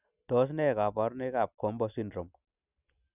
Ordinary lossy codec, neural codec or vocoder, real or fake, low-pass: none; none; real; 3.6 kHz